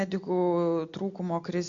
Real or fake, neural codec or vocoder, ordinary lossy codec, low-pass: real; none; MP3, 48 kbps; 7.2 kHz